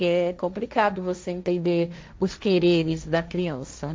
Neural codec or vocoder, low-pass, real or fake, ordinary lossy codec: codec, 16 kHz, 1.1 kbps, Voila-Tokenizer; none; fake; none